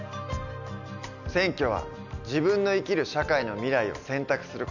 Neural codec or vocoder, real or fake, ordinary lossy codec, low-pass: none; real; none; 7.2 kHz